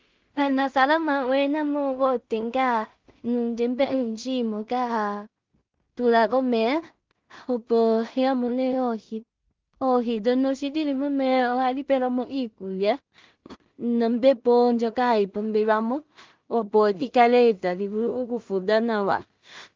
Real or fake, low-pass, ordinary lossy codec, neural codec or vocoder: fake; 7.2 kHz; Opus, 32 kbps; codec, 16 kHz in and 24 kHz out, 0.4 kbps, LongCat-Audio-Codec, two codebook decoder